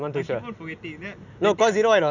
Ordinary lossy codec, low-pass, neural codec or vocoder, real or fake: none; 7.2 kHz; none; real